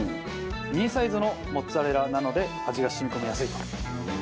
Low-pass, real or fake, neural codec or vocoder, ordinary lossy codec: none; real; none; none